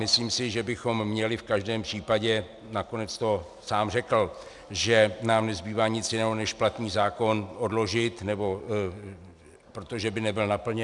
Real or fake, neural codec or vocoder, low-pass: real; none; 10.8 kHz